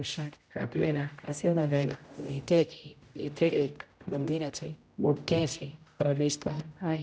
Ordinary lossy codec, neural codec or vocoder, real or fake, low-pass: none; codec, 16 kHz, 0.5 kbps, X-Codec, HuBERT features, trained on general audio; fake; none